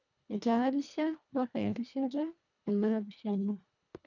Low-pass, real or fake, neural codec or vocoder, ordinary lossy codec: 7.2 kHz; fake; codec, 24 kHz, 1.5 kbps, HILCodec; none